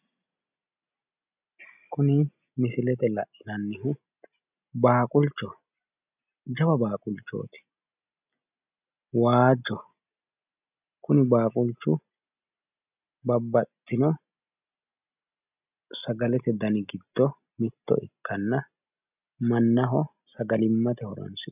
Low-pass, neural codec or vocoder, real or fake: 3.6 kHz; none; real